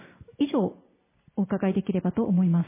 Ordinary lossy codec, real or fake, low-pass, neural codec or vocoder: MP3, 16 kbps; real; 3.6 kHz; none